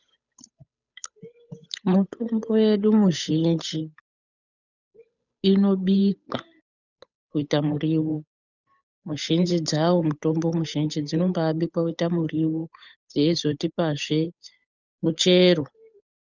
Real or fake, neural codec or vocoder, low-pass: fake; codec, 16 kHz, 8 kbps, FunCodec, trained on Chinese and English, 25 frames a second; 7.2 kHz